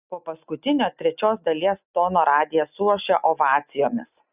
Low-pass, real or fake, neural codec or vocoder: 3.6 kHz; real; none